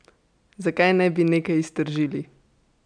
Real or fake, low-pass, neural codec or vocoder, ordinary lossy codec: real; 9.9 kHz; none; none